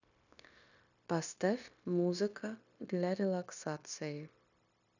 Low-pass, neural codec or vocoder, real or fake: 7.2 kHz; codec, 16 kHz, 0.9 kbps, LongCat-Audio-Codec; fake